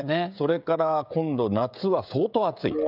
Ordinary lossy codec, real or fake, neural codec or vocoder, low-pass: none; fake; codec, 16 kHz, 16 kbps, FreqCodec, smaller model; 5.4 kHz